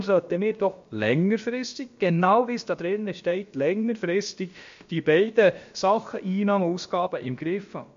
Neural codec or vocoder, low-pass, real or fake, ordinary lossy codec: codec, 16 kHz, about 1 kbps, DyCAST, with the encoder's durations; 7.2 kHz; fake; MP3, 48 kbps